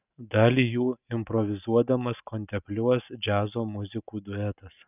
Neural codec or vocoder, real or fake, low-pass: none; real; 3.6 kHz